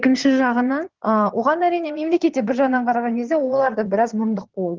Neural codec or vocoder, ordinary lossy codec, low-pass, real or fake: vocoder, 22.05 kHz, 80 mel bands, Vocos; Opus, 16 kbps; 7.2 kHz; fake